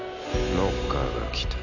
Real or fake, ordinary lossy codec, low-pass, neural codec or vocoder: real; none; 7.2 kHz; none